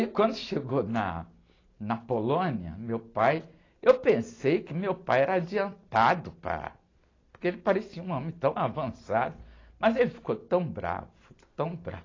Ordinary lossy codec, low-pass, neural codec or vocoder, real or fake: AAC, 32 kbps; 7.2 kHz; none; real